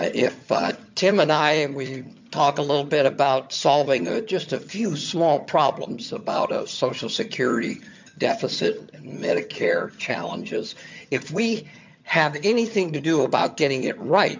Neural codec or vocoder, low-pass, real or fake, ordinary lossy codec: vocoder, 22.05 kHz, 80 mel bands, HiFi-GAN; 7.2 kHz; fake; MP3, 64 kbps